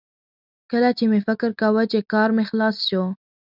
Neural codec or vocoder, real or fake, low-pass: none; real; 5.4 kHz